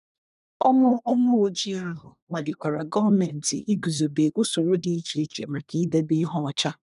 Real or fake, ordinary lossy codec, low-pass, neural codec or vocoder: fake; none; 10.8 kHz; codec, 24 kHz, 1 kbps, SNAC